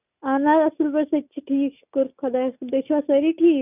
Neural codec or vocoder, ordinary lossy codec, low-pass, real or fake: none; none; 3.6 kHz; real